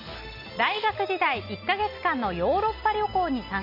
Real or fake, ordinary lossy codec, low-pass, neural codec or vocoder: real; none; 5.4 kHz; none